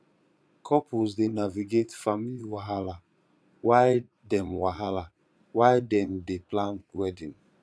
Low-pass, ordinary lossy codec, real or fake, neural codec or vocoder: none; none; fake; vocoder, 22.05 kHz, 80 mel bands, Vocos